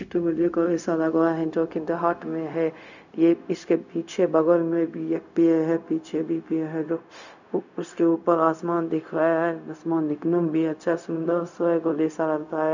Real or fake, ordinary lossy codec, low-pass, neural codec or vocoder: fake; none; 7.2 kHz; codec, 16 kHz, 0.4 kbps, LongCat-Audio-Codec